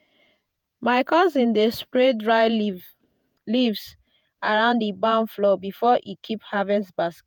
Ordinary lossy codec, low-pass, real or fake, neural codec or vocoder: none; none; fake; vocoder, 48 kHz, 128 mel bands, Vocos